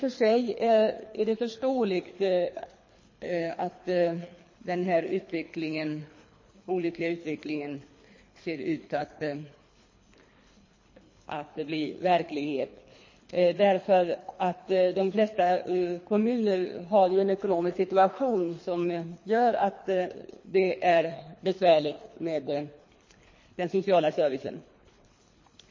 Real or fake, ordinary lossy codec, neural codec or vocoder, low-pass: fake; MP3, 32 kbps; codec, 24 kHz, 3 kbps, HILCodec; 7.2 kHz